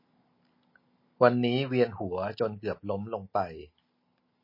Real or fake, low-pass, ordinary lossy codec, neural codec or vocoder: real; 5.4 kHz; MP3, 24 kbps; none